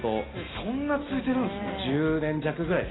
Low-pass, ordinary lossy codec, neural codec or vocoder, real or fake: 7.2 kHz; AAC, 16 kbps; none; real